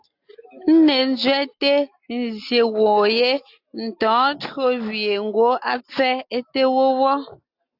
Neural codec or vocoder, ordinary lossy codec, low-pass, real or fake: none; AAC, 48 kbps; 5.4 kHz; real